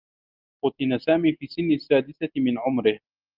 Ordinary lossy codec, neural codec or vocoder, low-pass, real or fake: Opus, 24 kbps; none; 5.4 kHz; real